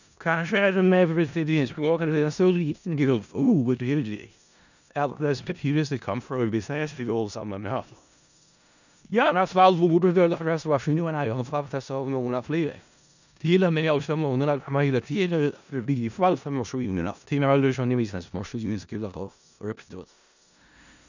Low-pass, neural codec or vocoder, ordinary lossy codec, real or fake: 7.2 kHz; codec, 16 kHz in and 24 kHz out, 0.4 kbps, LongCat-Audio-Codec, four codebook decoder; none; fake